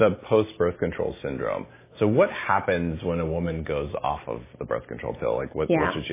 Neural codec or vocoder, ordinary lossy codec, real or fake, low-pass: none; MP3, 16 kbps; real; 3.6 kHz